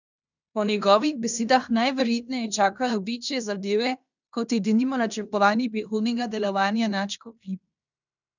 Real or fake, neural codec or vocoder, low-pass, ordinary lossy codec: fake; codec, 16 kHz in and 24 kHz out, 0.9 kbps, LongCat-Audio-Codec, fine tuned four codebook decoder; 7.2 kHz; none